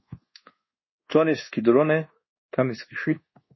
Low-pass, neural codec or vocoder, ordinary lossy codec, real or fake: 7.2 kHz; codec, 24 kHz, 1.2 kbps, DualCodec; MP3, 24 kbps; fake